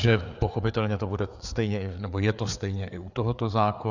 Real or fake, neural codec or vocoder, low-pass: fake; codec, 16 kHz, 4 kbps, FreqCodec, larger model; 7.2 kHz